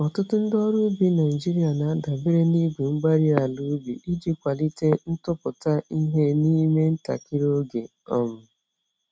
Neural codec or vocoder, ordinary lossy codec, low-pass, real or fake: none; none; none; real